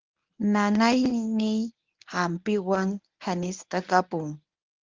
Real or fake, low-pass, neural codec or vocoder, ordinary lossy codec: fake; 7.2 kHz; codec, 24 kHz, 0.9 kbps, WavTokenizer, medium speech release version 1; Opus, 24 kbps